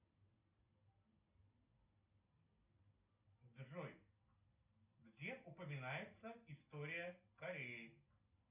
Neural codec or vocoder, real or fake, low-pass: none; real; 3.6 kHz